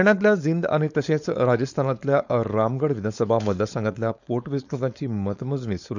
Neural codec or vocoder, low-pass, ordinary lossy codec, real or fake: codec, 16 kHz, 4.8 kbps, FACodec; 7.2 kHz; none; fake